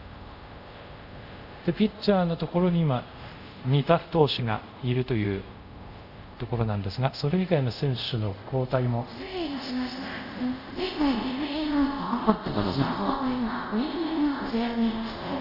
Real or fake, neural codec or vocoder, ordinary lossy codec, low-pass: fake; codec, 24 kHz, 0.5 kbps, DualCodec; Opus, 64 kbps; 5.4 kHz